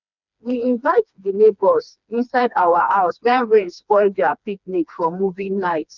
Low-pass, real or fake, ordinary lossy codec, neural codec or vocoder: 7.2 kHz; fake; none; codec, 16 kHz, 2 kbps, FreqCodec, smaller model